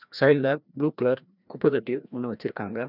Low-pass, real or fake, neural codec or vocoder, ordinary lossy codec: 5.4 kHz; fake; codec, 16 kHz, 1 kbps, FreqCodec, larger model; none